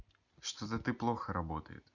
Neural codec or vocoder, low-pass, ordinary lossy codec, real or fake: none; 7.2 kHz; none; real